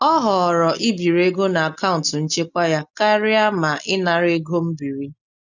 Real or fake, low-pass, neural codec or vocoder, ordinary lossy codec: real; 7.2 kHz; none; none